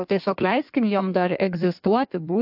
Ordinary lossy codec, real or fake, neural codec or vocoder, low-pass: AAC, 48 kbps; fake; codec, 16 kHz in and 24 kHz out, 1.1 kbps, FireRedTTS-2 codec; 5.4 kHz